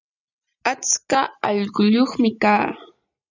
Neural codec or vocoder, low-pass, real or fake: none; 7.2 kHz; real